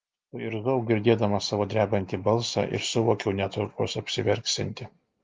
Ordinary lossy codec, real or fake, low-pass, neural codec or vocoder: Opus, 32 kbps; real; 7.2 kHz; none